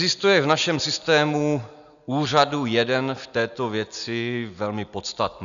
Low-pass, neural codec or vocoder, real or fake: 7.2 kHz; none; real